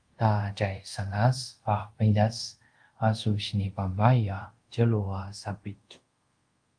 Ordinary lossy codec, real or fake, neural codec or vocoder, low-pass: Opus, 32 kbps; fake; codec, 24 kHz, 0.5 kbps, DualCodec; 9.9 kHz